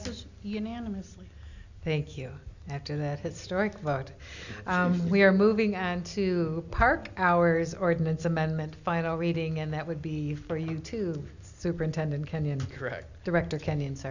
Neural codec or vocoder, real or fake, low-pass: none; real; 7.2 kHz